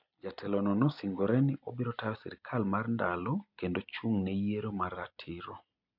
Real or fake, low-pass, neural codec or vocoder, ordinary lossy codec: real; 5.4 kHz; none; none